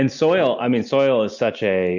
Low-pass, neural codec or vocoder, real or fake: 7.2 kHz; none; real